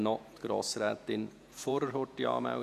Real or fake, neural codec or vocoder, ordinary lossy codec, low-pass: fake; vocoder, 48 kHz, 128 mel bands, Vocos; none; 14.4 kHz